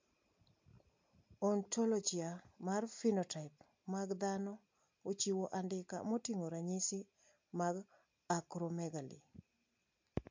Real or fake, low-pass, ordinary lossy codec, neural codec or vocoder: real; 7.2 kHz; MP3, 48 kbps; none